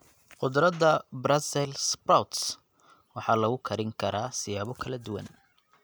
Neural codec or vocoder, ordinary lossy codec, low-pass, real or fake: none; none; none; real